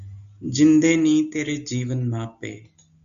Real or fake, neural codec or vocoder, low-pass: real; none; 7.2 kHz